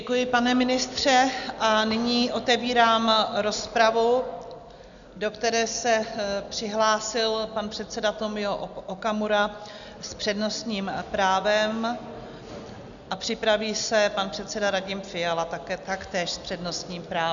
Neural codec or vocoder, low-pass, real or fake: none; 7.2 kHz; real